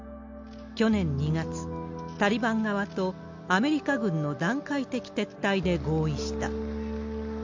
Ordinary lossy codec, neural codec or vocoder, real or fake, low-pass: MP3, 64 kbps; none; real; 7.2 kHz